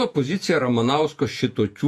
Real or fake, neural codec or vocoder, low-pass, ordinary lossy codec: fake; vocoder, 48 kHz, 128 mel bands, Vocos; 14.4 kHz; MP3, 64 kbps